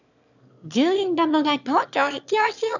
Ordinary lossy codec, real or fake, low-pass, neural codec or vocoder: none; fake; 7.2 kHz; autoencoder, 22.05 kHz, a latent of 192 numbers a frame, VITS, trained on one speaker